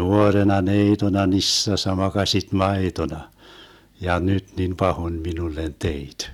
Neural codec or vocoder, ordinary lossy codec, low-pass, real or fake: none; none; 19.8 kHz; real